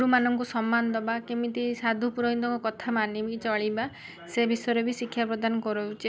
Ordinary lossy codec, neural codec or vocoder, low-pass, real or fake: none; none; none; real